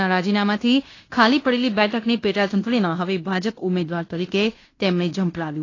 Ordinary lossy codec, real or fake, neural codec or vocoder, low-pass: AAC, 32 kbps; fake; codec, 16 kHz in and 24 kHz out, 0.9 kbps, LongCat-Audio-Codec, fine tuned four codebook decoder; 7.2 kHz